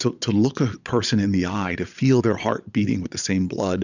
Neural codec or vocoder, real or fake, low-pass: vocoder, 22.05 kHz, 80 mel bands, Vocos; fake; 7.2 kHz